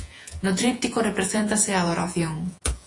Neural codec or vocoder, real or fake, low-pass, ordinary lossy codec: vocoder, 48 kHz, 128 mel bands, Vocos; fake; 10.8 kHz; AAC, 48 kbps